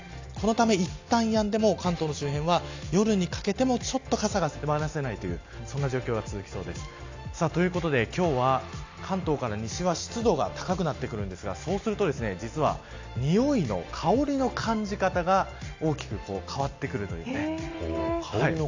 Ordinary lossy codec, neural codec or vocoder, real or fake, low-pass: AAC, 48 kbps; none; real; 7.2 kHz